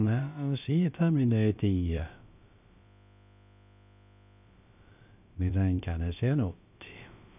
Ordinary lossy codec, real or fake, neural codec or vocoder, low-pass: none; fake; codec, 16 kHz, about 1 kbps, DyCAST, with the encoder's durations; 3.6 kHz